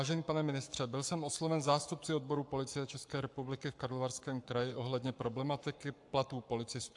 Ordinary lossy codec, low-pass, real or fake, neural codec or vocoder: AAC, 64 kbps; 10.8 kHz; fake; codec, 44.1 kHz, 7.8 kbps, Pupu-Codec